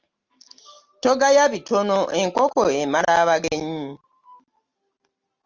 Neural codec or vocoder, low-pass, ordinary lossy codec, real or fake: none; 7.2 kHz; Opus, 32 kbps; real